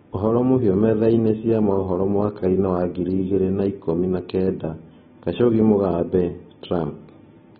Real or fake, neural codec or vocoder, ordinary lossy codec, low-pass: real; none; AAC, 16 kbps; 19.8 kHz